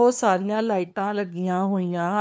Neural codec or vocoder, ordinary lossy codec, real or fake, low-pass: codec, 16 kHz, 2 kbps, FunCodec, trained on LibriTTS, 25 frames a second; none; fake; none